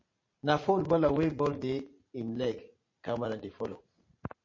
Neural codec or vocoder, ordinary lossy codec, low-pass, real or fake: vocoder, 44.1 kHz, 80 mel bands, Vocos; MP3, 32 kbps; 7.2 kHz; fake